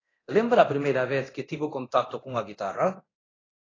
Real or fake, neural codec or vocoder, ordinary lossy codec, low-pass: fake; codec, 24 kHz, 0.9 kbps, DualCodec; AAC, 32 kbps; 7.2 kHz